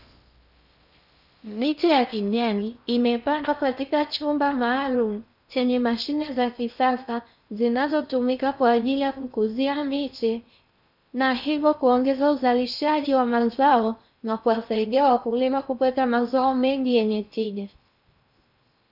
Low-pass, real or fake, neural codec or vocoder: 5.4 kHz; fake; codec, 16 kHz in and 24 kHz out, 0.6 kbps, FocalCodec, streaming, 4096 codes